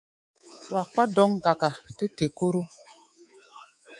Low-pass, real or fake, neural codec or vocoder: 10.8 kHz; fake; codec, 24 kHz, 3.1 kbps, DualCodec